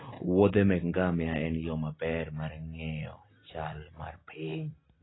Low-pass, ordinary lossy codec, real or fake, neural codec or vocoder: 7.2 kHz; AAC, 16 kbps; real; none